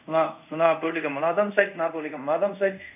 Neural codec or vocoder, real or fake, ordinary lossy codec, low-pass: codec, 24 kHz, 0.5 kbps, DualCodec; fake; none; 3.6 kHz